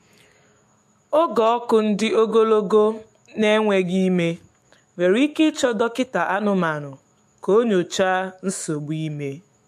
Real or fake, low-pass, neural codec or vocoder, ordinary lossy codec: fake; 14.4 kHz; autoencoder, 48 kHz, 128 numbers a frame, DAC-VAE, trained on Japanese speech; MP3, 64 kbps